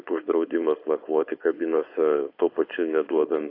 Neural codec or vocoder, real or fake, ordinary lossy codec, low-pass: codec, 16 kHz, 4.8 kbps, FACodec; fake; AAC, 32 kbps; 5.4 kHz